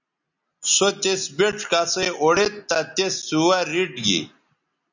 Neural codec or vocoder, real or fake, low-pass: none; real; 7.2 kHz